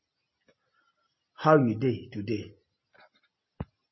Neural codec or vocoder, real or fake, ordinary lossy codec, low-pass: none; real; MP3, 24 kbps; 7.2 kHz